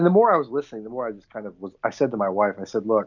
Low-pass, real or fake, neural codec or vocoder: 7.2 kHz; real; none